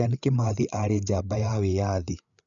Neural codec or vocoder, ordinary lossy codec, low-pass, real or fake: codec, 16 kHz, 8 kbps, FreqCodec, larger model; none; 7.2 kHz; fake